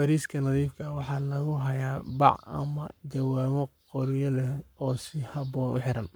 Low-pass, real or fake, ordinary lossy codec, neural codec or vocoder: none; fake; none; codec, 44.1 kHz, 7.8 kbps, Pupu-Codec